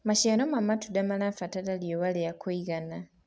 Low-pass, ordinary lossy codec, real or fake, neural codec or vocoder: none; none; real; none